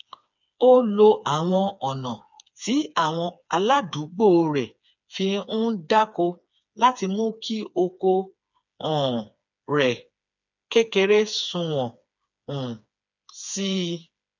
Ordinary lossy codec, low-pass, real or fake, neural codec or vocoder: none; 7.2 kHz; fake; codec, 16 kHz, 4 kbps, FreqCodec, smaller model